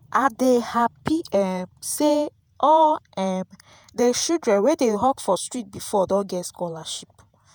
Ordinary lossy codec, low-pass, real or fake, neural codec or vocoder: none; none; fake; vocoder, 48 kHz, 128 mel bands, Vocos